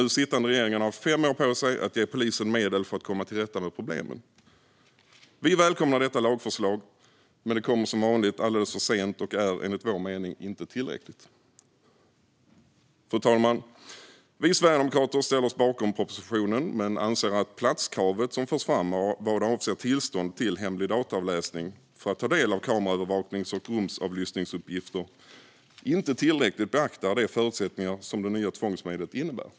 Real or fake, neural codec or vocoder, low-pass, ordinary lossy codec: real; none; none; none